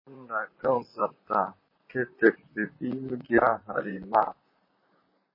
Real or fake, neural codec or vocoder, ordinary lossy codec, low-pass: fake; vocoder, 44.1 kHz, 128 mel bands, Pupu-Vocoder; MP3, 24 kbps; 5.4 kHz